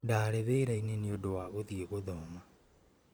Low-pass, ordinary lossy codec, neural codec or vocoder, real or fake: none; none; vocoder, 44.1 kHz, 128 mel bands every 512 samples, BigVGAN v2; fake